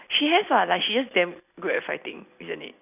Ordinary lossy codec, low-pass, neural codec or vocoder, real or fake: none; 3.6 kHz; none; real